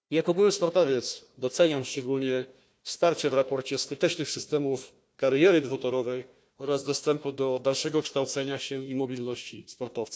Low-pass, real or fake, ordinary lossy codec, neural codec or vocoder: none; fake; none; codec, 16 kHz, 1 kbps, FunCodec, trained on Chinese and English, 50 frames a second